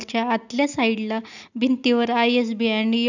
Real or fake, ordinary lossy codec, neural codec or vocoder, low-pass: real; none; none; 7.2 kHz